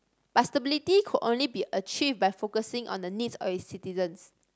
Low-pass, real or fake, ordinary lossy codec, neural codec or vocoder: none; real; none; none